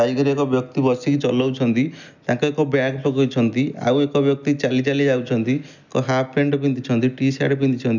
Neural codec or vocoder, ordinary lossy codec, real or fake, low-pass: none; none; real; 7.2 kHz